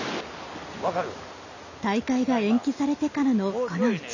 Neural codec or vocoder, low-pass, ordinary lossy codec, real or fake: none; 7.2 kHz; none; real